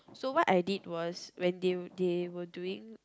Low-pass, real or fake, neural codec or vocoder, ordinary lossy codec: none; real; none; none